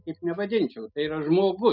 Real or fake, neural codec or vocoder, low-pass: real; none; 5.4 kHz